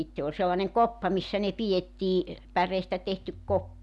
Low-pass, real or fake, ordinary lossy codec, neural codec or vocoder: none; real; none; none